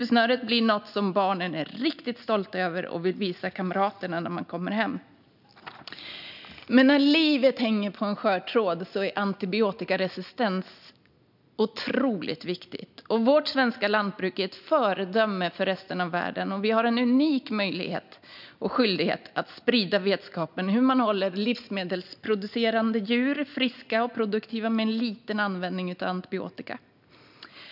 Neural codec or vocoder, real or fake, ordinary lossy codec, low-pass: none; real; none; 5.4 kHz